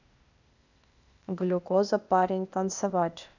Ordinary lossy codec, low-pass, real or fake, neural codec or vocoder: none; 7.2 kHz; fake; codec, 16 kHz, 0.8 kbps, ZipCodec